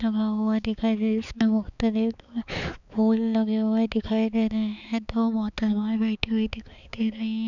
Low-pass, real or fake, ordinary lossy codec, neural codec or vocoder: 7.2 kHz; fake; none; codec, 16 kHz, 4 kbps, X-Codec, HuBERT features, trained on balanced general audio